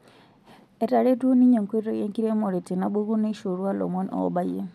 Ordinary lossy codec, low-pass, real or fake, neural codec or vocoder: none; 14.4 kHz; real; none